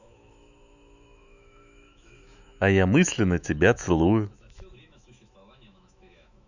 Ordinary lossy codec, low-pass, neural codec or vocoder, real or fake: none; 7.2 kHz; autoencoder, 48 kHz, 128 numbers a frame, DAC-VAE, trained on Japanese speech; fake